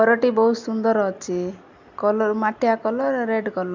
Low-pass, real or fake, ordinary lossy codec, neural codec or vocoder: 7.2 kHz; real; MP3, 64 kbps; none